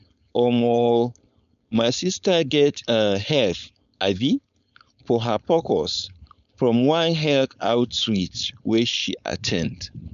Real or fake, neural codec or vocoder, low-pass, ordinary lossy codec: fake; codec, 16 kHz, 4.8 kbps, FACodec; 7.2 kHz; none